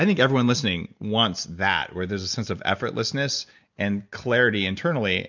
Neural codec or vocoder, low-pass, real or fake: none; 7.2 kHz; real